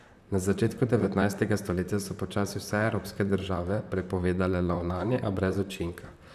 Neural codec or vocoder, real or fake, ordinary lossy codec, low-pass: vocoder, 44.1 kHz, 128 mel bands, Pupu-Vocoder; fake; none; 14.4 kHz